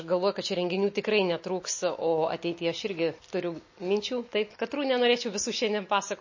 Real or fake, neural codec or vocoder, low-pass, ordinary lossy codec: real; none; 7.2 kHz; MP3, 32 kbps